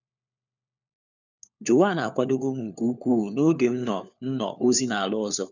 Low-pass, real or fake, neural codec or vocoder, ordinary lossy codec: 7.2 kHz; fake; codec, 16 kHz, 4 kbps, FunCodec, trained on LibriTTS, 50 frames a second; none